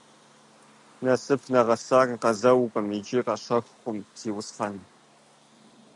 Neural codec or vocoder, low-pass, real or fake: none; 10.8 kHz; real